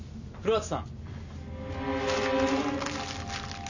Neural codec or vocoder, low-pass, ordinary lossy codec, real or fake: none; 7.2 kHz; none; real